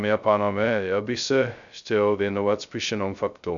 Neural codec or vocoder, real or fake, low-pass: codec, 16 kHz, 0.2 kbps, FocalCodec; fake; 7.2 kHz